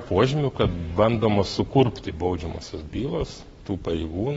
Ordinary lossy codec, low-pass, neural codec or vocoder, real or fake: AAC, 24 kbps; 19.8 kHz; codec, 44.1 kHz, 7.8 kbps, Pupu-Codec; fake